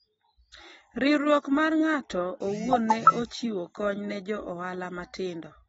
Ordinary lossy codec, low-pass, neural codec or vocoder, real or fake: AAC, 24 kbps; 19.8 kHz; none; real